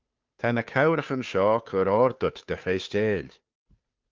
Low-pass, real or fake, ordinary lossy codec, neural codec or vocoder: 7.2 kHz; fake; Opus, 32 kbps; codec, 16 kHz, 2 kbps, FunCodec, trained on Chinese and English, 25 frames a second